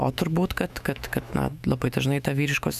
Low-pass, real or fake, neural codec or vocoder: 14.4 kHz; fake; autoencoder, 48 kHz, 128 numbers a frame, DAC-VAE, trained on Japanese speech